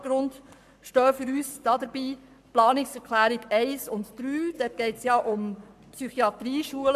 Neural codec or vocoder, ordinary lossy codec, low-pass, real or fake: codec, 44.1 kHz, 7.8 kbps, Pupu-Codec; MP3, 96 kbps; 14.4 kHz; fake